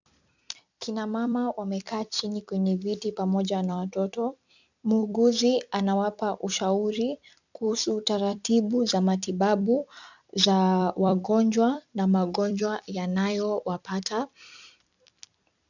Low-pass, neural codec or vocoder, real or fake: 7.2 kHz; vocoder, 44.1 kHz, 128 mel bands every 256 samples, BigVGAN v2; fake